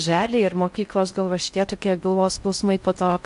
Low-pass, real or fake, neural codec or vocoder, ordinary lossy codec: 10.8 kHz; fake; codec, 16 kHz in and 24 kHz out, 0.6 kbps, FocalCodec, streaming, 4096 codes; AAC, 64 kbps